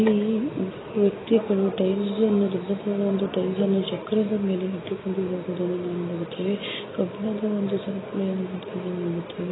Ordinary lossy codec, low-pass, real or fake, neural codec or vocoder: AAC, 16 kbps; 7.2 kHz; real; none